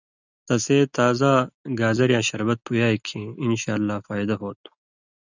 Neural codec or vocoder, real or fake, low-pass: none; real; 7.2 kHz